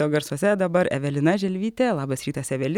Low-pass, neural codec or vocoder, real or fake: 19.8 kHz; none; real